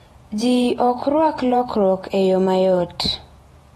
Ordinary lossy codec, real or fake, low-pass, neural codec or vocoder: AAC, 32 kbps; fake; 19.8 kHz; vocoder, 48 kHz, 128 mel bands, Vocos